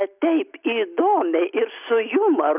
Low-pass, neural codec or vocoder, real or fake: 3.6 kHz; none; real